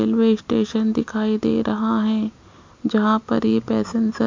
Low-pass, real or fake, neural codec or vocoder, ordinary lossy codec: 7.2 kHz; real; none; MP3, 48 kbps